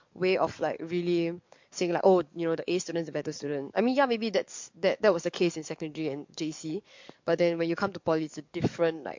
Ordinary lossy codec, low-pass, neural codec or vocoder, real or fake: MP3, 48 kbps; 7.2 kHz; codec, 44.1 kHz, 7.8 kbps, DAC; fake